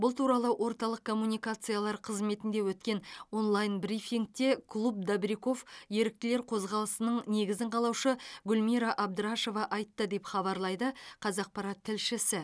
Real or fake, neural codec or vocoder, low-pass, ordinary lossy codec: real; none; none; none